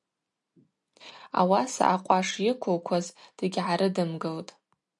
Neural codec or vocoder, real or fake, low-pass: none; real; 10.8 kHz